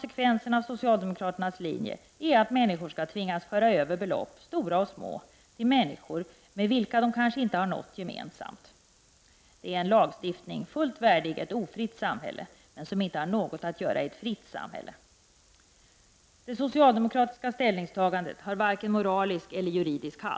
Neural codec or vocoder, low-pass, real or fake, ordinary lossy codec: none; none; real; none